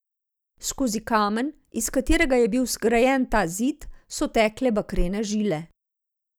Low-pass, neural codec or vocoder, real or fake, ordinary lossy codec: none; none; real; none